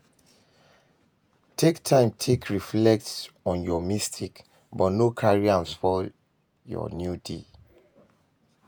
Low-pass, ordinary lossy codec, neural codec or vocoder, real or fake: none; none; vocoder, 48 kHz, 128 mel bands, Vocos; fake